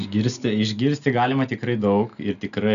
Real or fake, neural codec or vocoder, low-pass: real; none; 7.2 kHz